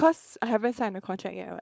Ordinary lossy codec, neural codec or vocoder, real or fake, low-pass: none; codec, 16 kHz, 4.8 kbps, FACodec; fake; none